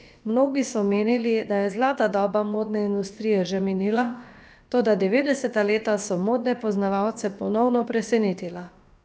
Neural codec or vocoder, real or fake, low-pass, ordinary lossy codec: codec, 16 kHz, about 1 kbps, DyCAST, with the encoder's durations; fake; none; none